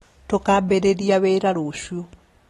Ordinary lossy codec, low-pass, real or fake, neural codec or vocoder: AAC, 32 kbps; 19.8 kHz; real; none